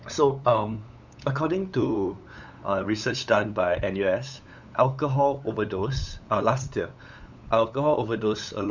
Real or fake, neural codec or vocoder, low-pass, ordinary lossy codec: fake; codec, 16 kHz, 8 kbps, FunCodec, trained on LibriTTS, 25 frames a second; 7.2 kHz; none